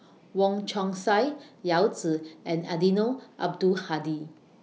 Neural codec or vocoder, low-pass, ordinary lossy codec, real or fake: none; none; none; real